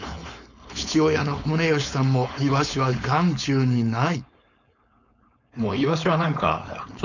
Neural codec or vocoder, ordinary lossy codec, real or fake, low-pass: codec, 16 kHz, 4.8 kbps, FACodec; none; fake; 7.2 kHz